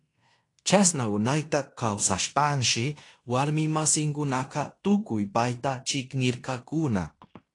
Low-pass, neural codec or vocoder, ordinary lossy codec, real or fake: 10.8 kHz; codec, 16 kHz in and 24 kHz out, 0.9 kbps, LongCat-Audio-Codec, fine tuned four codebook decoder; AAC, 48 kbps; fake